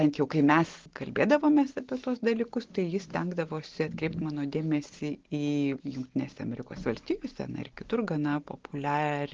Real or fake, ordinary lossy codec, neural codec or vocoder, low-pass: real; Opus, 16 kbps; none; 7.2 kHz